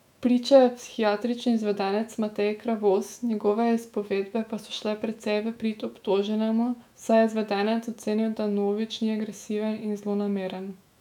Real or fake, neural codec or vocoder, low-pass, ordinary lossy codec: fake; autoencoder, 48 kHz, 128 numbers a frame, DAC-VAE, trained on Japanese speech; 19.8 kHz; none